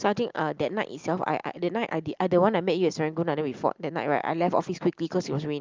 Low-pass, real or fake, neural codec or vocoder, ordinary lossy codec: 7.2 kHz; real; none; Opus, 24 kbps